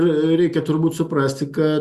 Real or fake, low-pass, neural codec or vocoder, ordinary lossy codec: real; 14.4 kHz; none; Opus, 64 kbps